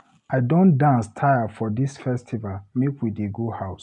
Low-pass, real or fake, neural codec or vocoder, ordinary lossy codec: 10.8 kHz; real; none; none